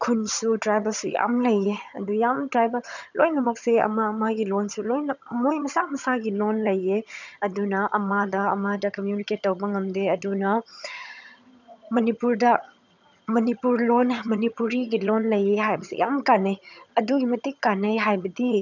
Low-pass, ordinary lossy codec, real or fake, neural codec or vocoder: 7.2 kHz; none; fake; vocoder, 22.05 kHz, 80 mel bands, HiFi-GAN